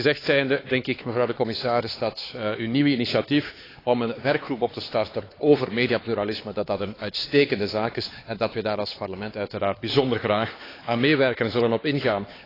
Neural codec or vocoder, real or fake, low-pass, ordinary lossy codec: codec, 16 kHz, 4 kbps, X-Codec, HuBERT features, trained on LibriSpeech; fake; 5.4 kHz; AAC, 24 kbps